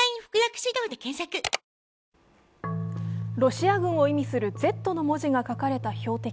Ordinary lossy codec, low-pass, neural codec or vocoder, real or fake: none; none; none; real